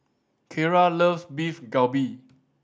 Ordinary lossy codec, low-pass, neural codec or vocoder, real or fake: none; none; none; real